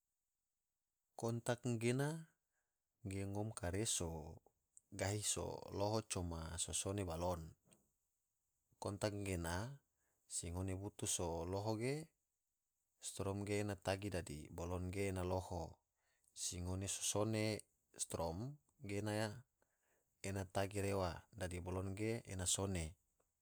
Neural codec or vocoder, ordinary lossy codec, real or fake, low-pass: none; none; real; none